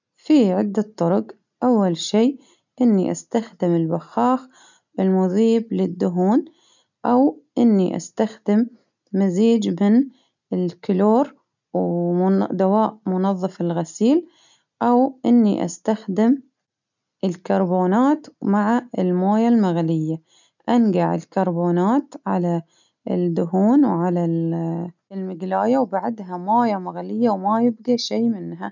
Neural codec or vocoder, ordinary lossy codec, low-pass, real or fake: none; none; 7.2 kHz; real